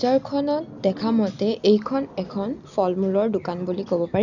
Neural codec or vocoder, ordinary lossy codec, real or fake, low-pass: none; none; real; 7.2 kHz